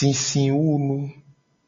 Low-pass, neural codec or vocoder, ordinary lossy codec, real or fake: 7.2 kHz; none; MP3, 32 kbps; real